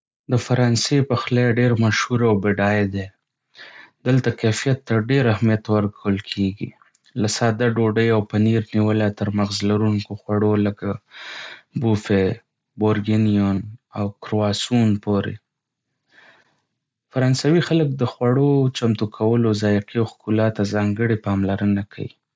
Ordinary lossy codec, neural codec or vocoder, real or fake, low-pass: none; none; real; none